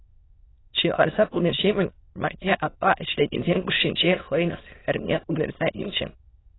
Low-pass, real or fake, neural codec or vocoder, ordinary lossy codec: 7.2 kHz; fake; autoencoder, 22.05 kHz, a latent of 192 numbers a frame, VITS, trained on many speakers; AAC, 16 kbps